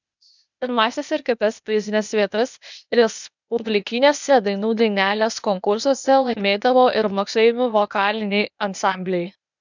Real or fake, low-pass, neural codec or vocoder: fake; 7.2 kHz; codec, 16 kHz, 0.8 kbps, ZipCodec